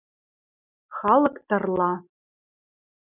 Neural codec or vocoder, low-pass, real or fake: none; 3.6 kHz; real